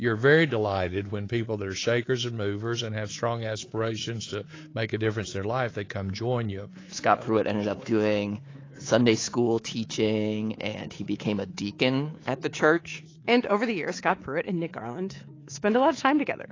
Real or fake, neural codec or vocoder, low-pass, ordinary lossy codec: fake; codec, 24 kHz, 3.1 kbps, DualCodec; 7.2 kHz; AAC, 32 kbps